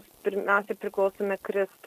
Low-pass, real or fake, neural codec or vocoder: 14.4 kHz; real; none